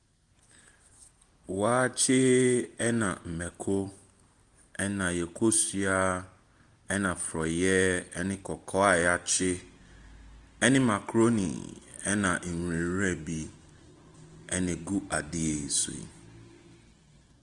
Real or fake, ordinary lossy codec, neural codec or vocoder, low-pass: real; Opus, 24 kbps; none; 10.8 kHz